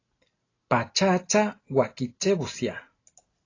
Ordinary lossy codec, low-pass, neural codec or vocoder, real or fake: AAC, 32 kbps; 7.2 kHz; none; real